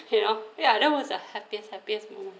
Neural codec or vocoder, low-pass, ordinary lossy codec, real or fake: none; none; none; real